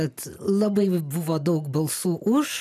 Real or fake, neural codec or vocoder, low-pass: real; none; 14.4 kHz